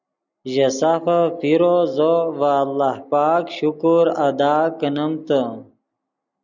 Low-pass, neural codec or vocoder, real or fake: 7.2 kHz; none; real